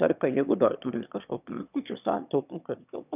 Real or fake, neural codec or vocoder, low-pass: fake; autoencoder, 22.05 kHz, a latent of 192 numbers a frame, VITS, trained on one speaker; 3.6 kHz